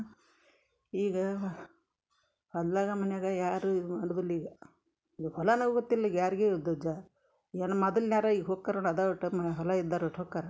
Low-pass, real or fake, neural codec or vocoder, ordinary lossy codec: none; real; none; none